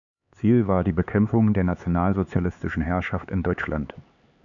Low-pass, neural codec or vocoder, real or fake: 7.2 kHz; codec, 16 kHz, 4 kbps, X-Codec, HuBERT features, trained on LibriSpeech; fake